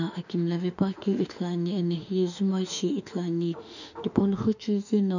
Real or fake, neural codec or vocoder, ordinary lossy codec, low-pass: fake; autoencoder, 48 kHz, 32 numbers a frame, DAC-VAE, trained on Japanese speech; none; 7.2 kHz